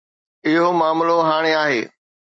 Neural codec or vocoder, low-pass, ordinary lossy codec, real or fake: none; 9.9 kHz; MP3, 32 kbps; real